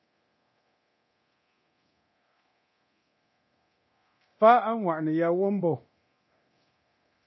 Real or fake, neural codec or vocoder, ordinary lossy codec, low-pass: fake; codec, 24 kHz, 0.9 kbps, DualCodec; MP3, 24 kbps; 7.2 kHz